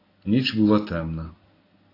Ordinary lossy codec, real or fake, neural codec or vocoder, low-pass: AAC, 24 kbps; real; none; 5.4 kHz